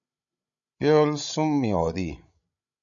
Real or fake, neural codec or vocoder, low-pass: fake; codec, 16 kHz, 16 kbps, FreqCodec, larger model; 7.2 kHz